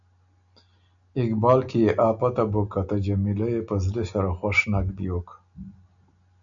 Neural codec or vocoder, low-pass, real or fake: none; 7.2 kHz; real